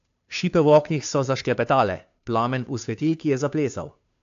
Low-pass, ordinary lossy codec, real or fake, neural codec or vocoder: 7.2 kHz; AAC, 64 kbps; fake; codec, 16 kHz, 2 kbps, FunCodec, trained on Chinese and English, 25 frames a second